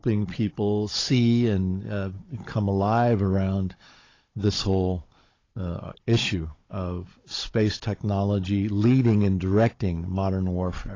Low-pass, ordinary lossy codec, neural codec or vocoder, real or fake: 7.2 kHz; AAC, 32 kbps; codec, 16 kHz, 16 kbps, FunCodec, trained on Chinese and English, 50 frames a second; fake